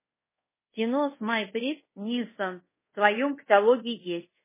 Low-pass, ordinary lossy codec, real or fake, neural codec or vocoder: 3.6 kHz; MP3, 16 kbps; fake; codec, 24 kHz, 0.5 kbps, DualCodec